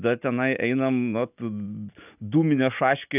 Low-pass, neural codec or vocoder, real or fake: 3.6 kHz; none; real